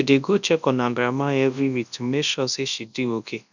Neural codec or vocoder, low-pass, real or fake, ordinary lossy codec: codec, 24 kHz, 0.9 kbps, WavTokenizer, large speech release; 7.2 kHz; fake; none